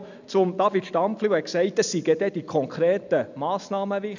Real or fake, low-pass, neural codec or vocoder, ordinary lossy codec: real; 7.2 kHz; none; none